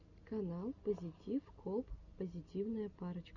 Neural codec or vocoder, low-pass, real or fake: none; 7.2 kHz; real